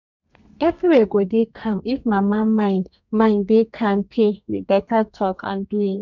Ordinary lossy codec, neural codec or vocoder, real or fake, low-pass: none; codec, 32 kHz, 1.9 kbps, SNAC; fake; 7.2 kHz